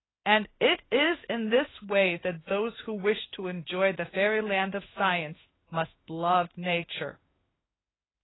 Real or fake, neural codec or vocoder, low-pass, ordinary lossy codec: fake; codec, 16 kHz in and 24 kHz out, 1 kbps, XY-Tokenizer; 7.2 kHz; AAC, 16 kbps